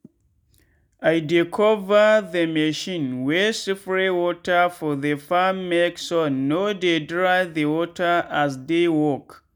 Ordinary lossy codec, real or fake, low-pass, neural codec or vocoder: none; real; 19.8 kHz; none